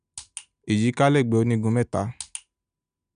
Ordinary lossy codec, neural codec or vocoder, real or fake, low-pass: none; none; real; 9.9 kHz